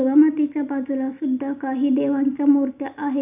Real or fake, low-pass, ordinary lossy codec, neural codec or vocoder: real; 3.6 kHz; none; none